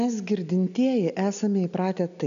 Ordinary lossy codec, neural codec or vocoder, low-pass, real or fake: AAC, 96 kbps; none; 7.2 kHz; real